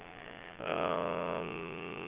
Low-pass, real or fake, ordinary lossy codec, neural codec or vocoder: 3.6 kHz; fake; Opus, 24 kbps; vocoder, 22.05 kHz, 80 mel bands, Vocos